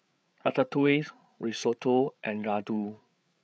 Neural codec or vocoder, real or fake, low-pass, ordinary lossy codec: codec, 16 kHz, 8 kbps, FreqCodec, larger model; fake; none; none